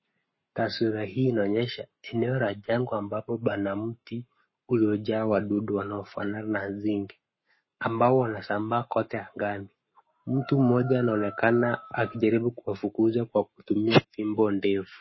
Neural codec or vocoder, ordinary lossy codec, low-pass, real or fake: codec, 44.1 kHz, 7.8 kbps, Pupu-Codec; MP3, 24 kbps; 7.2 kHz; fake